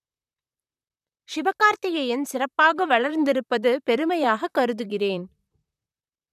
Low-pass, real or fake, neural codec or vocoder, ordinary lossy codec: 14.4 kHz; fake; vocoder, 44.1 kHz, 128 mel bands, Pupu-Vocoder; none